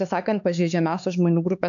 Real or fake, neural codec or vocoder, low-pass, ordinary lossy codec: fake; codec, 16 kHz, 4 kbps, X-Codec, HuBERT features, trained on LibriSpeech; 7.2 kHz; AAC, 64 kbps